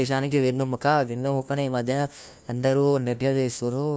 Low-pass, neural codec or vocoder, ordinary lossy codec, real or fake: none; codec, 16 kHz, 1 kbps, FunCodec, trained on LibriTTS, 50 frames a second; none; fake